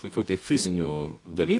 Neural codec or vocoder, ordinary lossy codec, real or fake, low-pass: codec, 24 kHz, 0.9 kbps, WavTokenizer, medium music audio release; MP3, 64 kbps; fake; 10.8 kHz